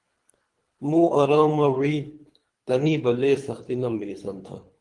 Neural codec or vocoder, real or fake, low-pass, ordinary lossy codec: codec, 24 kHz, 3 kbps, HILCodec; fake; 10.8 kHz; Opus, 24 kbps